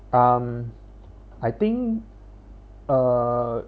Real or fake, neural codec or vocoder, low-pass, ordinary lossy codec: real; none; none; none